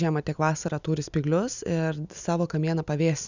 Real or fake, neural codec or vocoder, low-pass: real; none; 7.2 kHz